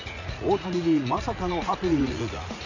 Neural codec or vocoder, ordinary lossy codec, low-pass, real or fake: vocoder, 44.1 kHz, 80 mel bands, Vocos; none; 7.2 kHz; fake